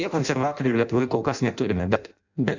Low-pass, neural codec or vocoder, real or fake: 7.2 kHz; codec, 16 kHz in and 24 kHz out, 0.6 kbps, FireRedTTS-2 codec; fake